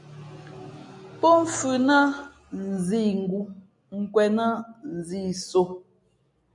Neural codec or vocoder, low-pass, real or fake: none; 10.8 kHz; real